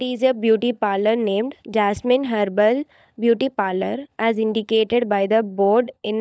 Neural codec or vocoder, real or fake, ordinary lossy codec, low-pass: codec, 16 kHz, 16 kbps, FunCodec, trained on LibriTTS, 50 frames a second; fake; none; none